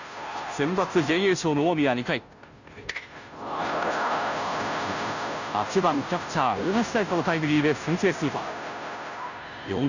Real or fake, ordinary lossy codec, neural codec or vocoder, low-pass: fake; none; codec, 16 kHz, 0.5 kbps, FunCodec, trained on Chinese and English, 25 frames a second; 7.2 kHz